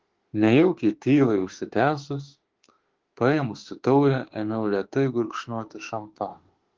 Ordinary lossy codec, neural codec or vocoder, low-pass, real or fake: Opus, 16 kbps; autoencoder, 48 kHz, 32 numbers a frame, DAC-VAE, trained on Japanese speech; 7.2 kHz; fake